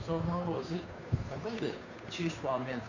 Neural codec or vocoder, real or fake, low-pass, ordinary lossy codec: codec, 16 kHz, 2 kbps, FunCodec, trained on Chinese and English, 25 frames a second; fake; 7.2 kHz; none